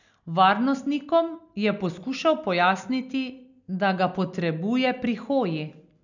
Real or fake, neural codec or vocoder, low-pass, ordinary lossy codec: real; none; 7.2 kHz; none